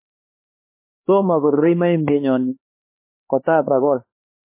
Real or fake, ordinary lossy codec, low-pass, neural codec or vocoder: fake; MP3, 24 kbps; 3.6 kHz; codec, 16 kHz, 4 kbps, X-Codec, HuBERT features, trained on LibriSpeech